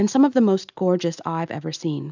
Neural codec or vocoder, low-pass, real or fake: none; 7.2 kHz; real